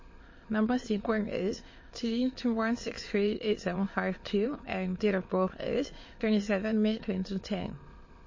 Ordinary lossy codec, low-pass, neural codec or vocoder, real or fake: MP3, 32 kbps; 7.2 kHz; autoencoder, 22.05 kHz, a latent of 192 numbers a frame, VITS, trained on many speakers; fake